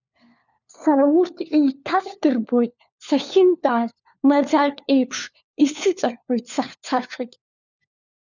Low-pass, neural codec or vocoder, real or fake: 7.2 kHz; codec, 16 kHz, 4 kbps, FunCodec, trained on LibriTTS, 50 frames a second; fake